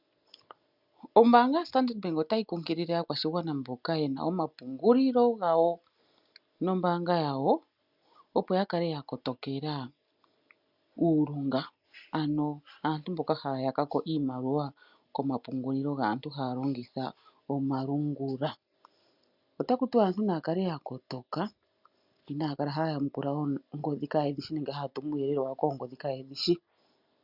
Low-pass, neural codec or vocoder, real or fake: 5.4 kHz; none; real